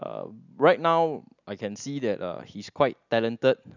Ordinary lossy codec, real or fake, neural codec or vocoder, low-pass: none; real; none; 7.2 kHz